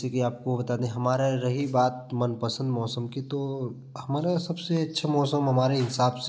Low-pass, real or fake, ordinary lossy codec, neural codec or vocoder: none; real; none; none